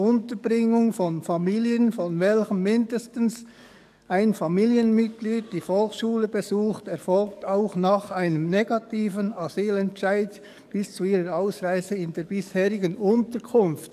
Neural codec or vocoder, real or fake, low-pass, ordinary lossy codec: codec, 44.1 kHz, 7.8 kbps, DAC; fake; 14.4 kHz; none